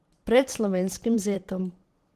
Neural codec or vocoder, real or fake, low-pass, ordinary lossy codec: vocoder, 44.1 kHz, 128 mel bands every 512 samples, BigVGAN v2; fake; 14.4 kHz; Opus, 16 kbps